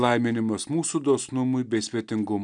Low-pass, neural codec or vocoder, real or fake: 9.9 kHz; none; real